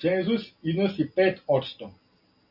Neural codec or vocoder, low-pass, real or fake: none; 5.4 kHz; real